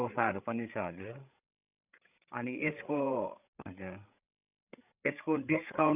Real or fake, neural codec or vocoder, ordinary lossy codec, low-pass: fake; codec, 16 kHz, 8 kbps, FreqCodec, larger model; none; 3.6 kHz